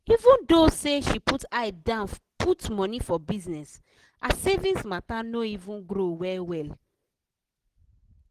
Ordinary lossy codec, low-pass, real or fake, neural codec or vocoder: Opus, 16 kbps; 14.4 kHz; real; none